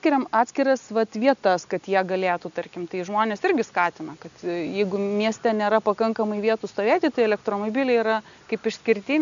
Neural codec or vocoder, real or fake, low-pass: none; real; 7.2 kHz